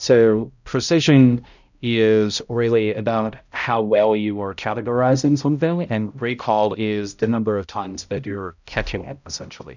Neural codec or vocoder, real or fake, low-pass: codec, 16 kHz, 0.5 kbps, X-Codec, HuBERT features, trained on balanced general audio; fake; 7.2 kHz